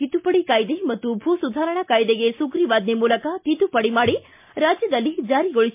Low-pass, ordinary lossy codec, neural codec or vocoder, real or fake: 3.6 kHz; MP3, 32 kbps; none; real